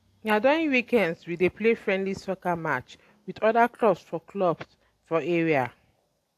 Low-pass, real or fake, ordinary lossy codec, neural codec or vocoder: 14.4 kHz; real; AAC, 64 kbps; none